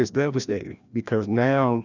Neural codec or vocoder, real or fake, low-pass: codec, 16 kHz, 1 kbps, FreqCodec, larger model; fake; 7.2 kHz